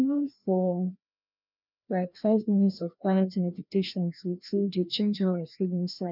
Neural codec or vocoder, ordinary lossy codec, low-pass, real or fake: codec, 16 kHz, 1 kbps, FreqCodec, larger model; none; 5.4 kHz; fake